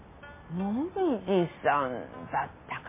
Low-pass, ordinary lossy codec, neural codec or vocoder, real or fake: 3.6 kHz; MP3, 16 kbps; none; real